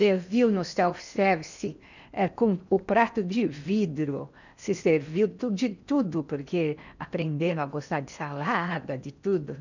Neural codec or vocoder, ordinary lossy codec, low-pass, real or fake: codec, 16 kHz in and 24 kHz out, 0.8 kbps, FocalCodec, streaming, 65536 codes; none; 7.2 kHz; fake